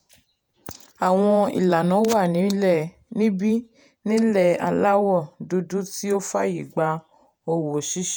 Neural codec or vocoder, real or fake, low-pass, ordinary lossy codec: vocoder, 48 kHz, 128 mel bands, Vocos; fake; none; none